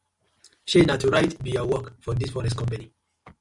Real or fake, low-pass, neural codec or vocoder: real; 10.8 kHz; none